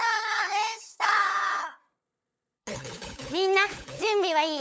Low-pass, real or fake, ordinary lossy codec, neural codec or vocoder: none; fake; none; codec, 16 kHz, 8 kbps, FunCodec, trained on LibriTTS, 25 frames a second